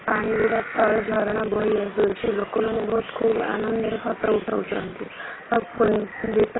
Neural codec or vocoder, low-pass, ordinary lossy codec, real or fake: none; 7.2 kHz; AAC, 16 kbps; real